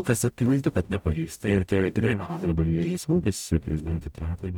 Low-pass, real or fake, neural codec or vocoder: 19.8 kHz; fake; codec, 44.1 kHz, 0.9 kbps, DAC